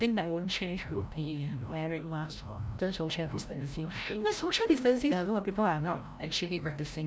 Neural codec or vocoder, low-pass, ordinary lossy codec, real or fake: codec, 16 kHz, 0.5 kbps, FreqCodec, larger model; none; none; fake